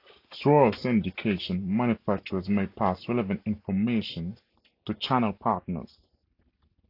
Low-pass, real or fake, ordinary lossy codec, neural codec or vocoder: 5.4 kHz; real; AAC, 32 kbps; none